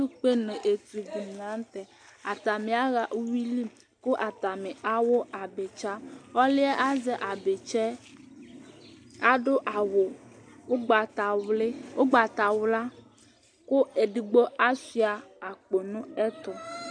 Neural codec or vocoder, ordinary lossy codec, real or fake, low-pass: none; MP3, 64 kbps; real; 9.9 kHz